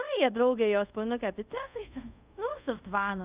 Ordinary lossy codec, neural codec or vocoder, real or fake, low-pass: Opus, 64 kbps; codec, 24 kHz, 0.5 kbps, DualCodec; fake; 3.6 kHz